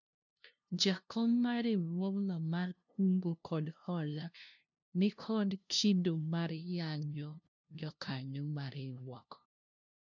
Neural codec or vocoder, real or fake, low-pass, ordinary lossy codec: codec, 16 kHz, 0.5 kbps, FunCodec, trained on LibriTTS, 25 frames a second; fake; 7.2 kHz; none